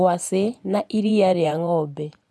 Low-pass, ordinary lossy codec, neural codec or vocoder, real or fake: none; none; vocoder, 24 kHz, 100 mel bands, Vocos; fake